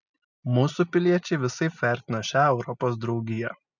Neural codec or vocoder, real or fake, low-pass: none; real; 7.2 kHz